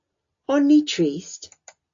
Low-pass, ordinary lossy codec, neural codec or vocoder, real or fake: 7.2 kHz; AAC, 64 kbps; none; real